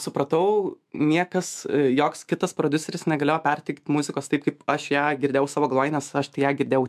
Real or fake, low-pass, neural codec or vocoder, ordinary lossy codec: fake; 14.4 kHz; autoencoder, 48 kHz, 128 numbers a frame, DAC-VAE, trained on Japanese speech; MP3, 96 kbps